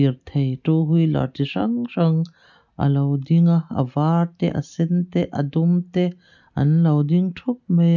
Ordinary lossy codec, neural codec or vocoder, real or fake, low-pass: none; none; real; 7.2 kHz